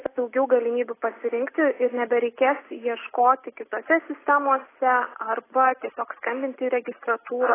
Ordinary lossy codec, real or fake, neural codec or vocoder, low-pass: AAC, 16 kbps; real; none; 3.6 kHz